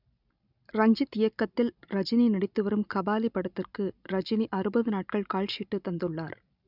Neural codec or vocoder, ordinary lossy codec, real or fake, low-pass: none; none; real; 5.4 kHz